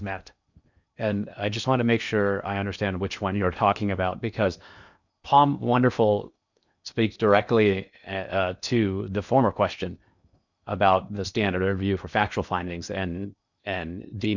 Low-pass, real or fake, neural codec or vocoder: 7.2 kHz; fake; codec, 16 kHz in and 24 kHz out, 0.8 kbps, FocalCodec, streaming, 65536 codes